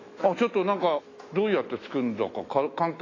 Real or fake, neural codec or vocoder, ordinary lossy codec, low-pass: fake; autoencoder, 48 kHz, 128 numbers a frame, DAC-VAE, trained on Japanese speech; AAC, 32 kbps; 7.2 kHz